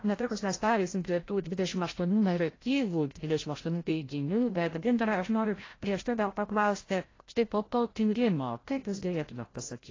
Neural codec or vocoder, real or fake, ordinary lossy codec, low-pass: codec, 16 kHz, 0.5 kbps, FreqCodec, larger model; fake; AAC, 32 kbps; 7.2 kHz